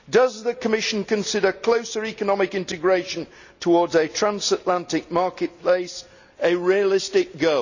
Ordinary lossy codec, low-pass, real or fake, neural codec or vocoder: none; 7.2 kHz; real; none